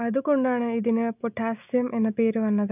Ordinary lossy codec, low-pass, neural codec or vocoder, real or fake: none; 3.6 kHz; none; real